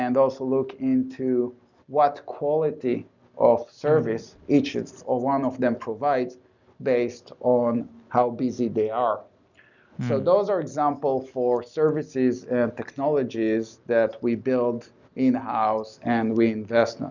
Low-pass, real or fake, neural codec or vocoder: 7.2 kHz; real; none